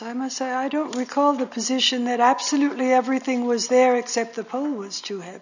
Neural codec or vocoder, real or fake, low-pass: none; real; 7.2 kHz